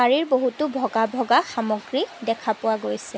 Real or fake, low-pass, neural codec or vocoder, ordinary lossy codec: real; none; none; none